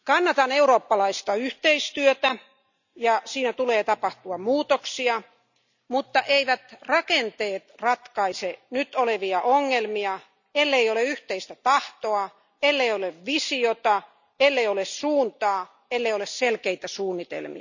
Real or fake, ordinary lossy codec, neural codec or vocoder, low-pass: real; none; none; 7.2 kHz